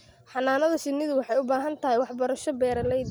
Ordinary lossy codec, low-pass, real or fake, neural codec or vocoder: none; none; real; none